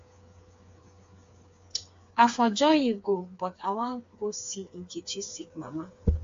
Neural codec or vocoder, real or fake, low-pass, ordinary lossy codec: codec, 16 kHz, 4 kbps, FreqCodec, smaller model; fake; 7.2 kHz; AAC, 48 kbps